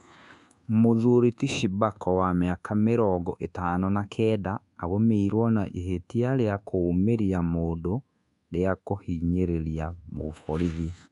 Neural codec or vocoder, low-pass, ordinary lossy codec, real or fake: codec, 24 kHz, 1.2 kbps, DualCodec; 10.8 kHz; AAC, 96 kbps; fake